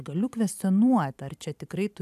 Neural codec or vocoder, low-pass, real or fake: vocoder, 44.1 kHz, 128 mel bands every 512 samples, BigVGAN v2; 14.4 kHz; fake